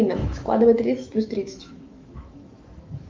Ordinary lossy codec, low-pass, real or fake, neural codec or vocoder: Opus, 24 kbps; 7.2 kHz; fake; autoencoder, 48 kHz, 128 numbers a frame, DAC-VAE, trained on Japanese speech